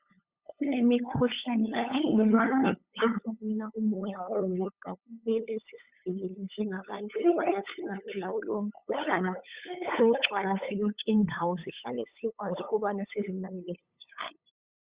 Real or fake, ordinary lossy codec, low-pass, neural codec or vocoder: fake; Opus, 64 kbps; 3.6 kHz; codec, 16 kHz, 8 kbps, FunCodec, trained on LibriTTS, 25 frames a second